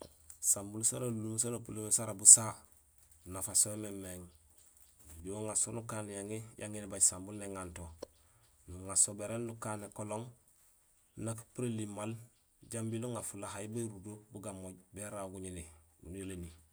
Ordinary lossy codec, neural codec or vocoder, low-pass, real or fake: none; none; none; real